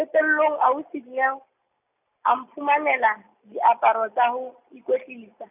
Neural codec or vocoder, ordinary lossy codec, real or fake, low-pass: none; none; real; 3.6 kHz